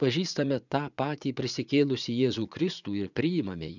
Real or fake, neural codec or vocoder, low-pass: fake; vocoder, 44.1 kHz, 80 mel bands, Vocos; 7.2 kHz